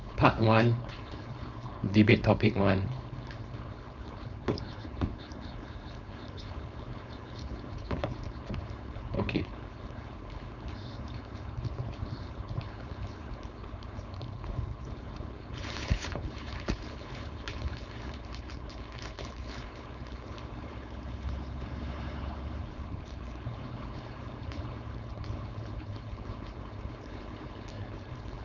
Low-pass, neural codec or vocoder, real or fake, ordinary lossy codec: 7.2 kHz; codec, 16 kHz, 4.8 kbps, FACodec; fake; none